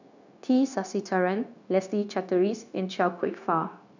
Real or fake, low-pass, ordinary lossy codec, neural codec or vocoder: fake; 7.2 kHz; none; codec, 16 kHz, 0.9 kbps, LongCat-Audio-Codec